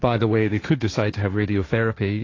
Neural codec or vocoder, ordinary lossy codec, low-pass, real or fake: codec, 16 kHz, 1.1 kbps, Voila-Tokenizer; AAC, 32 kbps; 7.2 kHz; fake